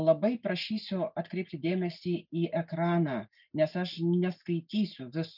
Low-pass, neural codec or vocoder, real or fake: 5.4 kHz; none; real